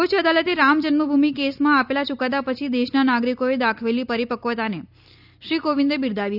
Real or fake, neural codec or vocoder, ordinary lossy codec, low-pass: real; none; none; 5.4 kHz